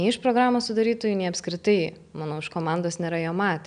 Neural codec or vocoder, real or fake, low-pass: none; real; 9.9 kHz